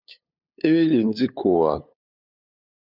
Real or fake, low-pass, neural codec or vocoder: fake; 5.4 kHz; codec, 16 kHz, 8 kbps, FunCodec, trained on LibriTTS, 25 frames a second